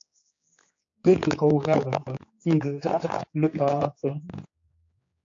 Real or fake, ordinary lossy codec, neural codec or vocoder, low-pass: fake; AAC, 48 kbps; codec, 16 kHz, 4 kbps, X-Codec, HuBERT features, trained on general audio; 7.2 kHz